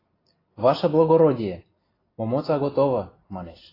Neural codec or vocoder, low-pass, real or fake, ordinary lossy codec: none; 5.4 kHz; real; AAC, 24 kbps